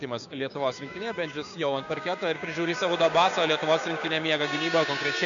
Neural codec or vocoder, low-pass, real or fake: codec, 16 kHz, 6 kbps, DAC; 7.2 kHz; fake